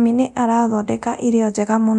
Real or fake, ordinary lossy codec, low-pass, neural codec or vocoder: fake; none; 10.8 kHz; codec, 24 kHz, 0.9 kbps, DualCodec